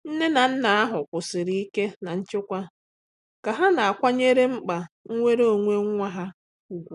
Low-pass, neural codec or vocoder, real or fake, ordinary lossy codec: 10.8 kHz; none; real; none